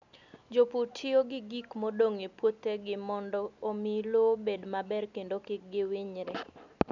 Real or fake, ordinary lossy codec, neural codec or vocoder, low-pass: real; none; none; 7.2 kHz